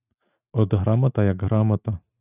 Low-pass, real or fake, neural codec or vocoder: 3.6 kHz; real; none